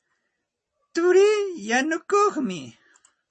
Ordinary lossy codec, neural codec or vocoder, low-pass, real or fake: MP3, 32 kbps; none; 10.8 kHz; real